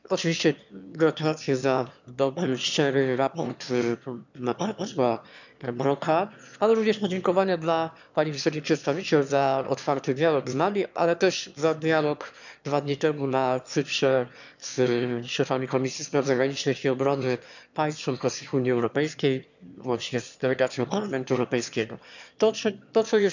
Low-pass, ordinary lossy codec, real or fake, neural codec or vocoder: 7.2 kHz; none; fake; autoencoder, 22.05 kHz, a latent of 192 numbers a frame, VITS, trained on one speaker